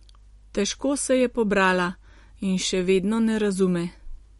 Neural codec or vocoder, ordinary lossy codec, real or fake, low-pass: none; MP3, 48 kbps; real; 19.8 kHz